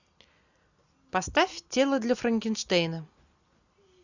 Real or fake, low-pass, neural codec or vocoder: real; 7.2 kHz; none